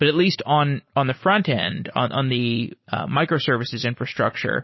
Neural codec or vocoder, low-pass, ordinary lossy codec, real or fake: none; 7.2 kHz; MP3, 24 kbps; real